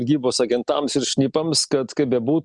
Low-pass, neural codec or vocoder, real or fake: 10.8 kHz; none; real